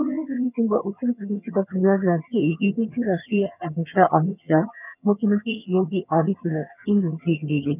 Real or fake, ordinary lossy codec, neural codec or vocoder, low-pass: fake; none; vocoder, 22.05 kHz, 80 mel bands, HiFi-GAN; 3.6 kHz